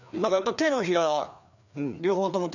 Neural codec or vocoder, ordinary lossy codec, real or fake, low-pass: codec, 16 kHz, 2 kbps, FreqCodec, larger model; none; fake; 7.2 kHz